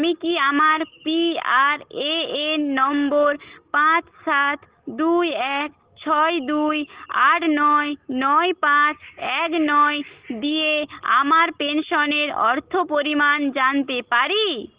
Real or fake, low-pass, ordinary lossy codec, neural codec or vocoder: real; 3.6 kHz; Opus, 16 kbps; none